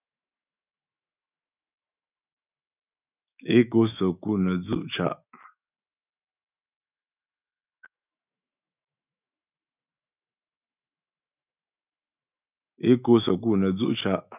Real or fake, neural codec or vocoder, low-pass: fake; autoencoder, 48 kHz, 128 numbers a frame, DAC-VAE, trained on Japanese speech; 3.6 kHz